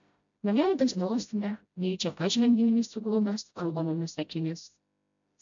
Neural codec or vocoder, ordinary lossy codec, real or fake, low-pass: codec, 16 kHz, 0.5 kbps, FreqCodec, smaller model; MP3, 64 kbps; fake; 7.2 kHz